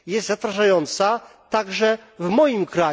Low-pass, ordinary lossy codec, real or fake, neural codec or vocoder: none; none; real; none